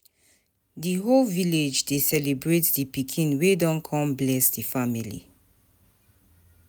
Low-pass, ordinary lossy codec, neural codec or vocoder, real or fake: none; none; none; real